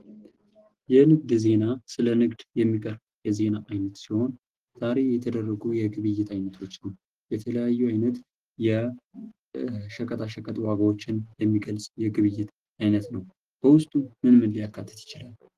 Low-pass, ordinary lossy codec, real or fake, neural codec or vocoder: 14.4 kHz; Opus, 16 kbps; real; none